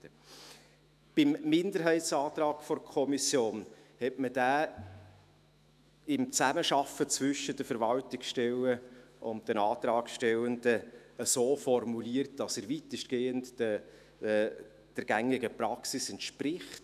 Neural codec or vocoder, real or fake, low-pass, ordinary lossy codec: autoencoder, 48 kHz, 128 numbers a frame, DAC-VAE, trained on Japanese speech; fake; 14.4 kHz; none